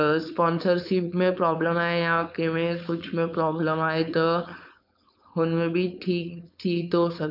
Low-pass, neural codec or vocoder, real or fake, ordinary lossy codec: 5.4 kHz; codec, 16 kHz, 4.8 kbps, FACodec; fake; none